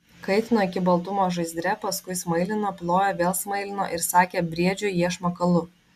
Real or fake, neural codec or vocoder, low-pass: real; none; 14.4 kHz